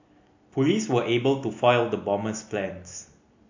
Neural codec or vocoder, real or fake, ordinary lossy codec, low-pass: none; real; AAC, 48 kbps; 7.2 kHz